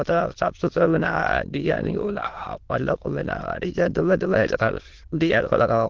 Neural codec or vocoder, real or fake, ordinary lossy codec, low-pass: autoencoder, 22.05 kHz, a latent of 192 numbers a frame, VITS, trained on many speakers; fake; Opus, 16 kbps; 7.2 kHz